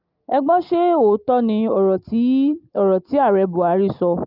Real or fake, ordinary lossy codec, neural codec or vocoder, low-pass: real; Opus, 24 kbps; none; 5.4 kHz